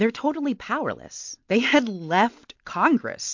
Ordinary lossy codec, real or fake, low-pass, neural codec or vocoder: MP3, 48 kbps; real; 7.2 kHz; none